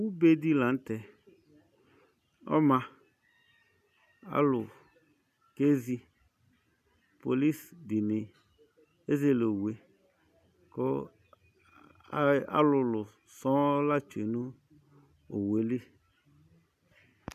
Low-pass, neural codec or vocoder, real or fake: 14.4 kHz; none; real